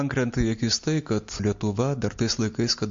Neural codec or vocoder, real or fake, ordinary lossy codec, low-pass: none; real; MP3, 48 kbps; 7.2 kHz